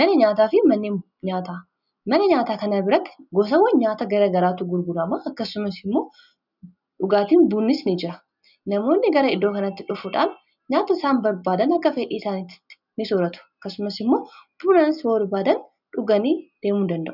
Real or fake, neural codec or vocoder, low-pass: real; none; 5.4 kHz